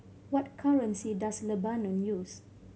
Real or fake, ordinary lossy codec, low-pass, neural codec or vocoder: real; none; none; none